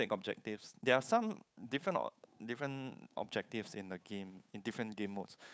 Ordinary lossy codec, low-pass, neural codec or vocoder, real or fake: none; none; codec, 16 kHz, 8 kbps, FunCodec, trained on Chinese and English, 25 frames a second; fake